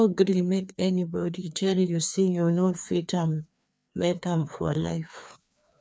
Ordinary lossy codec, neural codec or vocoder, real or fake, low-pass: none; codec, 16 kHz, 2 kbps, FreqCodec, larger model; fake; none